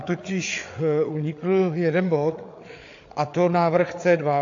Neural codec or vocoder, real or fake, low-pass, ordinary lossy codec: codec, 16 kHz, 8 kbps, FreqCodec, larger model; fake; 7.2 kHz; AAC, 48 kbps